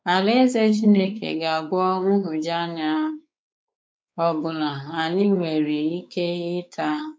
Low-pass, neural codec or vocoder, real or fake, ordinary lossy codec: none; codec, 16 kHz, 4 kbps, X-Codec, WavLM features, trained on Multilingual LibriSpeech; fake; none